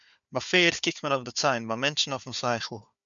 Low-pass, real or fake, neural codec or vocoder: 7.2 kHz; fake; codec, 16 kHz, 4 kbps, FunCodec, trained on Chinese and English, 50 frames a second